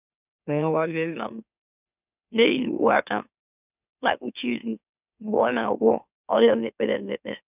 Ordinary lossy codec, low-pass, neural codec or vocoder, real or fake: none; 3.6 kHz; autoencoder, 44.1 kHz, a latent of 192 numbers a frame, MeloTTS; fake